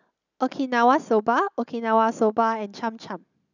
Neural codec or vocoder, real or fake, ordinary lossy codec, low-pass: none; real; none; 7.2 kHz